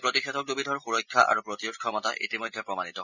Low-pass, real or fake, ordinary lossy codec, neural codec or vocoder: 7.2 kHz; real; none; none